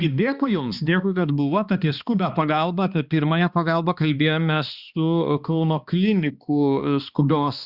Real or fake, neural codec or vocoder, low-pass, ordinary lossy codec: fake; codec, 16 kHz, 2 kbps, X-Codec, HuBERT features, trained on balanced general audio; 5.4 kHz; Opus, 64 kbps